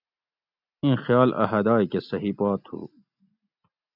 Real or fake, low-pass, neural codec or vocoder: real; 5.4 kHz; none